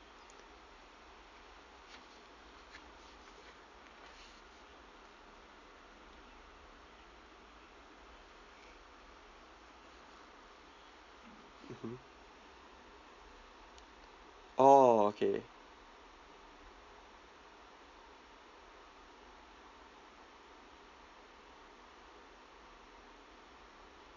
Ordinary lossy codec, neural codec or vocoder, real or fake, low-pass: none; none; real; 7.2 kHz